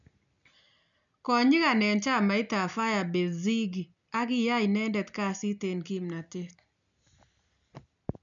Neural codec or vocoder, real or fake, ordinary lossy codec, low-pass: none; real; none; 7.2 kHz